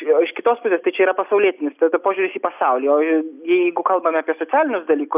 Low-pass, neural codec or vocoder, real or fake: 3.6 kHz; none; real